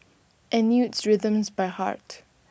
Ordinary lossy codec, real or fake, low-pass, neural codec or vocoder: none; real; none; none